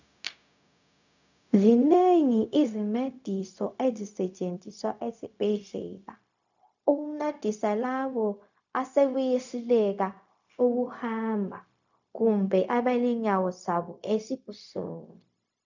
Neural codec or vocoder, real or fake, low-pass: codec, 16 kHz, 0.4 kbps, LongCat-Audio-Codec; fake; 7.2 kHz